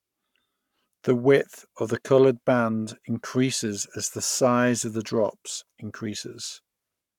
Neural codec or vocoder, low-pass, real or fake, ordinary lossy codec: codec, 44.1 kHz, 7.8 kbps, Pupu-Codec; 19.8 kHz; fake; none